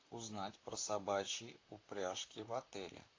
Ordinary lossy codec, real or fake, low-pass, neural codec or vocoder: AAC, 32 kbps; fake; 7.2 kHz; vocoder, 44.1 kHz, 128 mel bands, Pupu-Vocoder